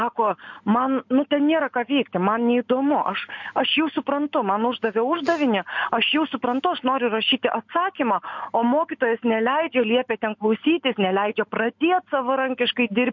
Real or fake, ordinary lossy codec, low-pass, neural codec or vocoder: real; MP3, 48 kbps; 7.2 kHz; none